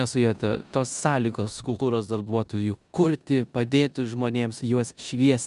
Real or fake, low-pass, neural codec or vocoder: fake; 10.8 kHz; codec, 16 kHz in and 24 kHz out, 0.9 kbps, LongCat-Audio-Codec, four codebook decoder